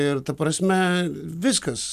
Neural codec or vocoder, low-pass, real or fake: none; 14.4 kHz; real